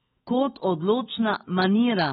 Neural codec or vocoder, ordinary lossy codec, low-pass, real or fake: autoencoder, 48 kHz, 128 numbers a frame, DAC-VAE, trained on Japanese speech; AAC, 16 kbps; 19.8 kHz; fake